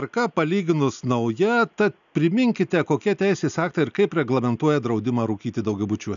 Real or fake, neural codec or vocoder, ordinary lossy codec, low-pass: real; none; AAC, 96 kbps; 7.2 kHz